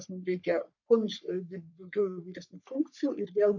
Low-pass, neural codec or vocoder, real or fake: 7.2 kHz; codec, 44.1 kHz, 3.4 kbps, Pupu-Codec; fake